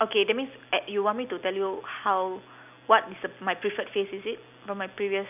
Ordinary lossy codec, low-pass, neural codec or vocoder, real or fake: none; 3.6 kHz; none; real